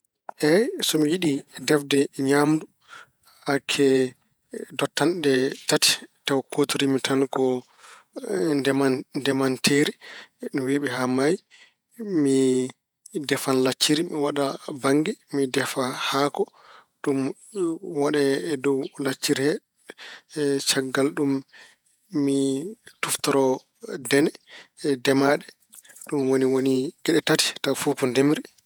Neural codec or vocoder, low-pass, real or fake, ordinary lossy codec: vocoder, 48 kHz, 128 mel bands, Vocos; none; fake; none